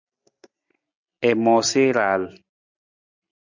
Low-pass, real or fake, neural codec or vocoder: 7.2 kHz; real; none